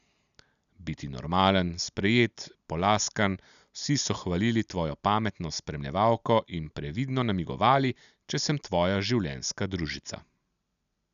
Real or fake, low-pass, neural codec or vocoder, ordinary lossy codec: real; 7.2 kHz; none; none